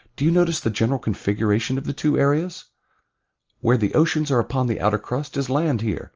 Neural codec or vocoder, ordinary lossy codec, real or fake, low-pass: none; Opus, 24 kbps; real; 7.2 kHz